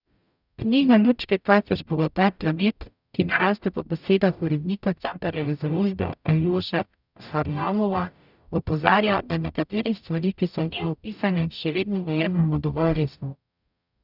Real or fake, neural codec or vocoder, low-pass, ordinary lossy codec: fake; codec, 44.1 kHz, 0.9 kbps, DAC; 5.4 kHz; none